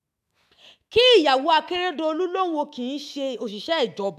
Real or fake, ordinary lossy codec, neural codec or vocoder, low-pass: fake; AAC, 96 kbps; autoencoder, 48 kHz, 128 numbers a frame, DAC-VAE, trained on Japanese speech; 14.4 kHz